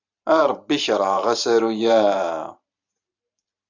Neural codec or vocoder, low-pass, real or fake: vocoder, 44.1 kHz, 128 mel bands every 512 samples, BigVGAN v2; 7.2 kHz; fake